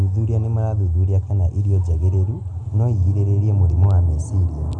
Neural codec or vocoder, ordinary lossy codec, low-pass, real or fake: none; none; 10.8 kHz; real